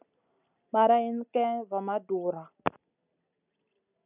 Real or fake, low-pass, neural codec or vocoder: real; 3.6 kHz; none